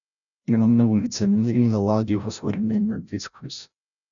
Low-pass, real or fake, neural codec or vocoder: 7.2 kHz; fake; codec, 16 kHz, 0.5 kbps, FreqCodec, larger model